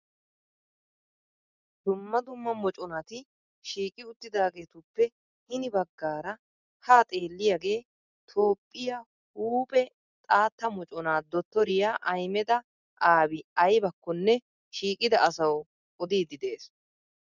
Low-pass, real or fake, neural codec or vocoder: 7.2 kHz; real; none